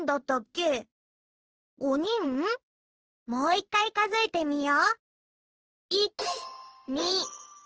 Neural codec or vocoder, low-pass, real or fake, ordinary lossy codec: codec, 16 kHz in and 24 kHz out, 1 kbps, XY-Tokenizer; 7.2 kHz; fake; Opus, 16 kbps